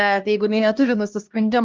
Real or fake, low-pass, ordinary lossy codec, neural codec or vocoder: fake; 7.2 kHz; Opus, 24 kbps; codec, 16 kHz, 1 kbps, X-Codec, HuBERT features, trained on LibriSpeech